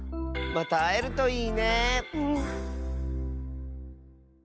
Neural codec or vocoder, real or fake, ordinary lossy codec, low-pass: none; real; none; none